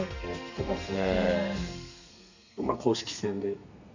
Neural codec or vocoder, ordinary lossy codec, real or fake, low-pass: codec, 32 kHz, 1.9 kbps, SNAC; none; fake; 7.2 kHz